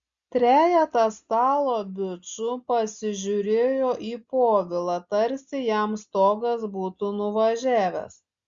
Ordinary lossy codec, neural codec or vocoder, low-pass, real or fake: Opus, 64 kbps; none; 7.2 kHz; real